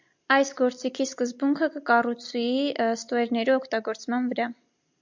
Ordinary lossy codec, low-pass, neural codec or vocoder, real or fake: MP3, 64 kbps; 7.2 kHz; none; real